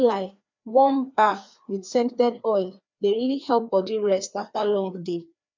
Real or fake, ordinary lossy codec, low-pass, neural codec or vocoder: fake; MP3, 64 kbps; 7.2 kHz; codec, 16 kHz, 2 kbps, FreqCodec, larger model